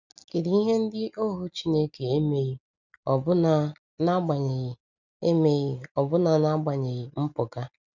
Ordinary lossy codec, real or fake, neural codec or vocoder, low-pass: none; real; none; 7.2 kHz